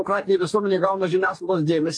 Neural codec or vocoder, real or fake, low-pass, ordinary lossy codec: codec, 44.1 kHz, 2.6 kbps, DAC; fake; 9.9 kHz; AAC, 48 kbps